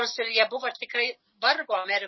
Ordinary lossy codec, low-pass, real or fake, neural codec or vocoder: MP3, 24 kbps; 7.2 kHz; real; none